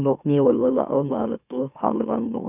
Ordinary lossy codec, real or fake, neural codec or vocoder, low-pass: none; fake; autoencoder, 44.1 kHz, a latent of 192 numbers a frame, MeloTTS; 3.6 kHz